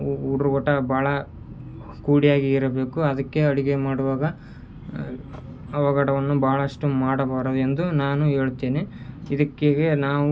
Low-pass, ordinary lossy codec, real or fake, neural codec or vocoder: none; none; real; none